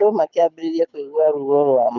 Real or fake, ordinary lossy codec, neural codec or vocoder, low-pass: fake; none; codec, 24 kHz, 6 kbps, HILCodec; 7.2 kHz